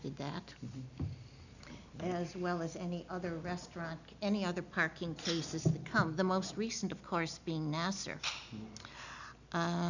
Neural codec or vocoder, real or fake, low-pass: none; real; 7.2 kHz